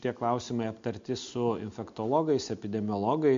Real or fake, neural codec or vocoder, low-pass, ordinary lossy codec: real; none; 7.2 kHz; MP3, 48 kbps